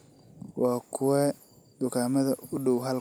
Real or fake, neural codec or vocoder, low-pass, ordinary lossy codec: real; none; none; none